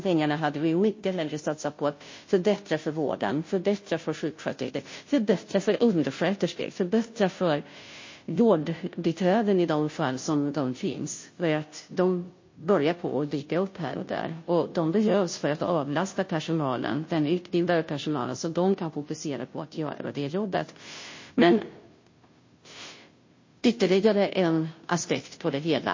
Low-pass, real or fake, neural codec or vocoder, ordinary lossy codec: 7.2 kHz; fake; codec, 16 kHz, 0.5 kbps, FunCodec, trained on Chinese and English, 25 frames a second; MP3, 32 kbps